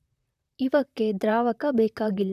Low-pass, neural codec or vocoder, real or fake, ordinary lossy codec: 14.4 kHz; vocoder, 44.1 kHz, 128 mel bands, Pupu-Vocoder; fake; none